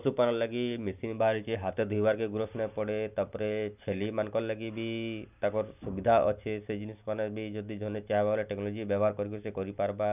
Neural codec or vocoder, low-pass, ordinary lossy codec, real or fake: none; 3.6 kHz; none; real